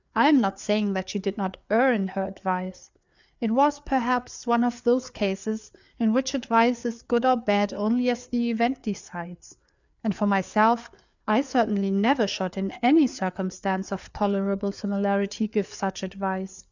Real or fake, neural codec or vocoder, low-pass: fake; codec, 16 kHz, 4 kbps, FreqCodec, larger model; 7.2 kHz